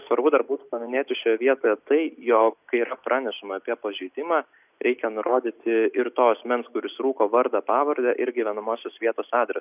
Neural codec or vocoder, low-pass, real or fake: none; 3.6 kHz; real